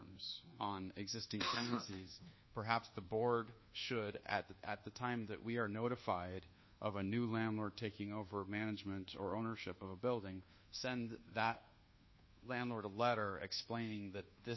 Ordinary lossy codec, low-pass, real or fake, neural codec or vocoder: MP3, 24 kbps; 7.2 kHz; fake; codec, 24 kHz, 1.2 kbps, DualCodec